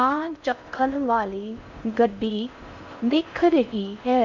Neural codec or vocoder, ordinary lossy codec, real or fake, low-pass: codec, 16 kHz in and 24 kHz out, 0.6 kbps, FocalCodec, streaming, 4096 codes; none; fake; 7.2 kHz